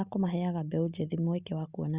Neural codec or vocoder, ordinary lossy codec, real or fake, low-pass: none; Opus, 64 kbps; real; 3.6 kHz